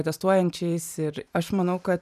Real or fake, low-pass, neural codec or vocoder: fake; 14.4 kHz; vocoder, 44.1 kHz, 128 mel bands every 512 samples, BigVGAN v2